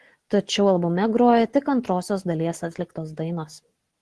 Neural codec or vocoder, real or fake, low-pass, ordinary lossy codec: none; real; 10.8 kHz; Opus, 16 kbps